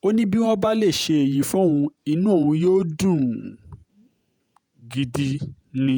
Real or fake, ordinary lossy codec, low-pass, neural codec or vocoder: fake; none; none; vocoder, 48 kHz, 128 mel bands, Vocos